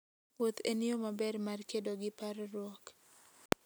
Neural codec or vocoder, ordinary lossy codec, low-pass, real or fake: none; none; none; real